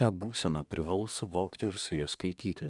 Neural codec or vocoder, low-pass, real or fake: codec, 24 kHz, 1 kbps, SNAC; 10.8 kHz; fake